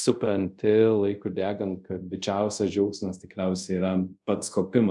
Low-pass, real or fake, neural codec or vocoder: 10.8 kHz; fake; codec, 24 kHz, 0.5 kbps, DualCodec